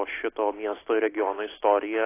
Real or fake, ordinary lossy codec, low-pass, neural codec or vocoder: real; AAC, 16 kbps; 3.6 kHz; none